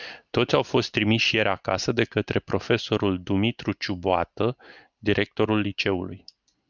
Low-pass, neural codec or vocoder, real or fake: 7.2 kHz; none; real